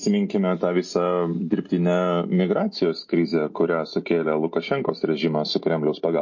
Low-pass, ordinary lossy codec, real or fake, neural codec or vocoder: 7.2 kHz; MP3, 32 kbps; real; none